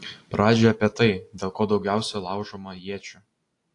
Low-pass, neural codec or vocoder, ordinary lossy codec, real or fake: 10.8 kHz; none; AAC, 48 kbps; real